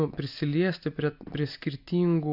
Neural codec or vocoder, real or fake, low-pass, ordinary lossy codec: none; real; 5.4 kHz; AAC, 48 kbps